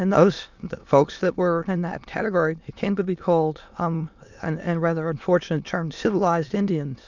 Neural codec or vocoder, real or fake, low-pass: autoencoder, 22.05 kHz, a latent of 192 numbers a frame, VITS, trained on many speakers; fake; 7.2 kHz